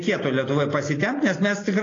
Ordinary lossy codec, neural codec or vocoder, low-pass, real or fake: AAC, 32 kbps; none; 7.2 kHz; real